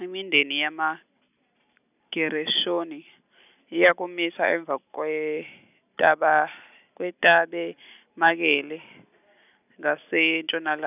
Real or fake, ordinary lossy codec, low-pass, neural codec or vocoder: real; none; 3.6 kHz; none